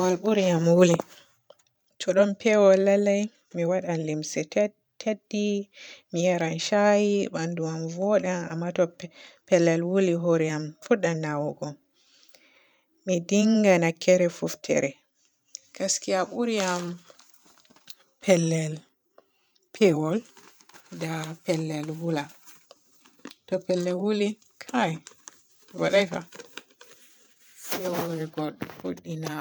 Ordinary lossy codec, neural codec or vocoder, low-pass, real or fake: none; vocoder, 44.1 kHz, 128 mel bands every 512 samples, BigVGAN v2; none; fake